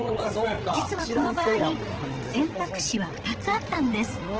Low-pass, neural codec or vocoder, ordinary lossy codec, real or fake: 7.2 kHz; codec, 16 kHz, 16 kbps, FreqCodec, larger model; Opus, 16 kbps; fake